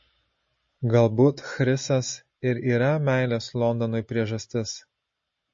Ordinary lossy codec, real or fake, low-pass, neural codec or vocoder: MP3, 32 kbps; real; 7.2 kHz; none